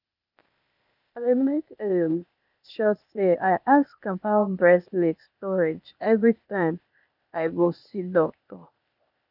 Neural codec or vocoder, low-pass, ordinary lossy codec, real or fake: codec, 16 kHz, 0.8 kbps, ZipCodec; 5.4 kHz; none; fake